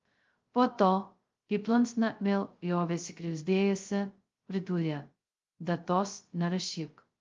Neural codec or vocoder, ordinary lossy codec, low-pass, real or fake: codec, 16 kHz, 0.2 kbps, FocalCodec; Opus, 32 kbps; 7.2 kHz; fake